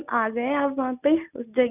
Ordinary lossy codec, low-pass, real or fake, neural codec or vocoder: none; 3.6 kHz; real; none